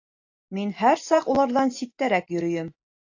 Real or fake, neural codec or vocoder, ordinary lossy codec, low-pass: real; none; AAC, 48 kbps; 7.2 kHz